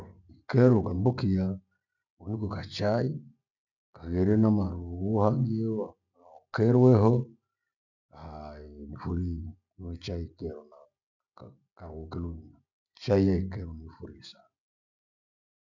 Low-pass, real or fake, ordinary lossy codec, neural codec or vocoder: 7.2 kHz; real; none; none